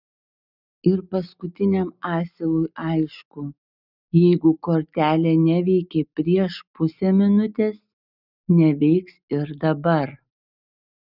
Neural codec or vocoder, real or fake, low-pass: none; real; 5.4 kHz